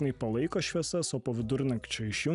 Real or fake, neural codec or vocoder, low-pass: real; none; 10.8 kHz